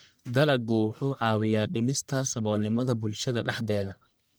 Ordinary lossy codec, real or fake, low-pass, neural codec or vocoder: none; fake; none; codec, 44.1 kHz, 1.7 kbps, Pupu-Codec